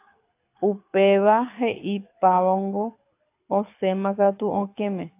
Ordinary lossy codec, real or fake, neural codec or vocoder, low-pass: AAC, 24 kbps; fake; codec, 24 kHz, 6 kbps, HILCodec; 3.6 kHz